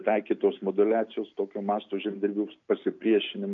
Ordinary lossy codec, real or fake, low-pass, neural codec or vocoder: MP3, 96 kbps; real; 7.2 kHz; none